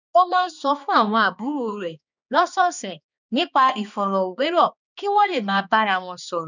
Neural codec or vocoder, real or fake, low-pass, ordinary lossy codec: codec, 16 kHz, 2 kbps, X-Codec, HuBERT features, trained on balanced general audio; fake; 7.2 kHz; none